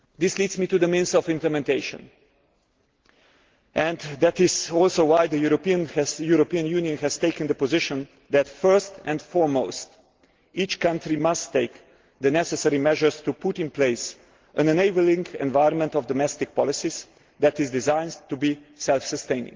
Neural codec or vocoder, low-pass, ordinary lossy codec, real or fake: none; 7.2 kHz; Opus, 16 kbps; real